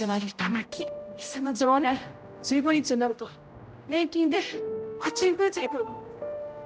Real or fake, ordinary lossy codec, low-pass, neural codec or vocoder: fake; none; none; codec, 16 kHz, 0.5 kbps, X-Codec, HuBERT features, trained on general audio